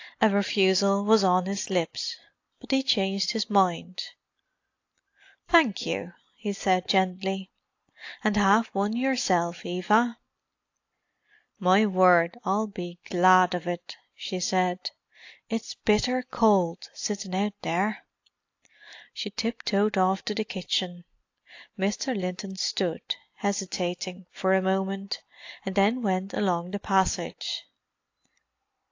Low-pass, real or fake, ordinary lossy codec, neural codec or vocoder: 7.2 kHz; real; AAC, 48 kbps; none